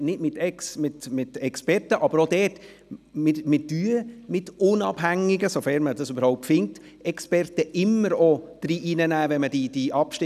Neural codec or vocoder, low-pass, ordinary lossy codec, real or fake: none; 14.4 kHz; none; real